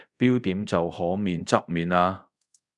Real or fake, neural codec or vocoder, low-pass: fake; codec, 24 kHz, 0.5 kbps, DualCodec; 10.8 kHz